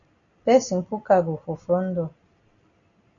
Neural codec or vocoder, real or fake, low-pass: none; real; 7.2 kHz